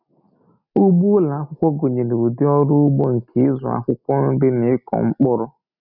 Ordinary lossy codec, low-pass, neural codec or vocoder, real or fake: none; 5.4 kHz; none; real